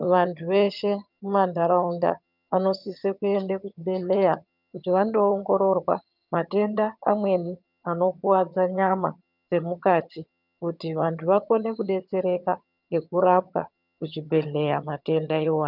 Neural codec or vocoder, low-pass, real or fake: vocoder, 22.05 kHz, 80 mel bands, HiFi-GAN; 5.4 kHz; fake